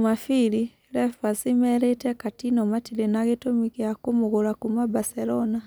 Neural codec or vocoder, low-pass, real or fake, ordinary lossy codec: none; none; real; none